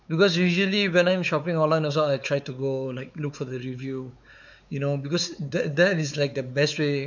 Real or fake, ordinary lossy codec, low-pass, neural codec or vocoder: fake; none; 7.2 kHz; codec, 16 kHz, 4 kbps, X-Codec, WavLM features, trained on Multilingual LibriSpeech